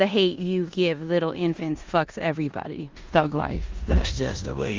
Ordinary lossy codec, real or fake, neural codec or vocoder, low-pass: Opus, 32 kbps; fake; codec, 16 kHz in and 24 kHz out, 0.9 kbps, LongCat-Audio-Codec, four codebook decoder; 7.2 kHz